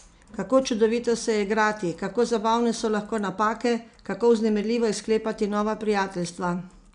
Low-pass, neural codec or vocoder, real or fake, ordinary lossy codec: 9.9 kHz; none; real; none